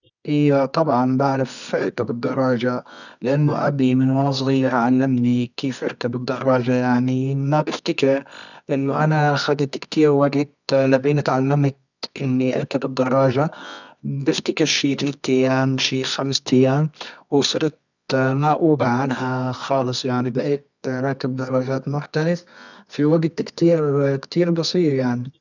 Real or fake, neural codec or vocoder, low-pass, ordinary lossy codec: fake; codec, 24 kHz, 0.9 kbps, WavTokenizer, medium music audio release; 7.2 kHz; none